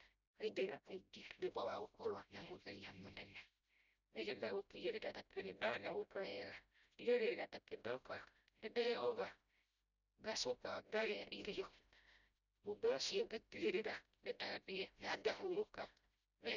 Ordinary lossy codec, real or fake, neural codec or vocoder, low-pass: none; fake; codec, 16 kHz, 0.5 kbps, FreqCodec, smaller model; 7.2 kHz